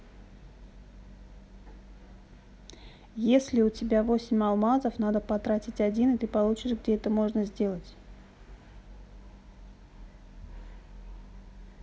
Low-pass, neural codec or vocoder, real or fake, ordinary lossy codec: none; none; real; none